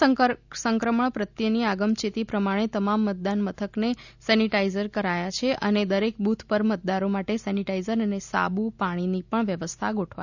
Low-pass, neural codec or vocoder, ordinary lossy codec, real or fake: 7.2 kHz; none; none; real